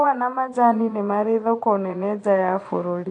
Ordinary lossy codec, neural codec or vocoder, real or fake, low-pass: AAC, 48 kbps; vocoder, 22.05 kHz, 80 mel bands, Vocos; fake; 9.9 kHz